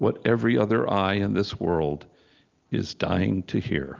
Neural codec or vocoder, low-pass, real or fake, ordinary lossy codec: none; 7.2 kHz; real; Opus, 32 kbps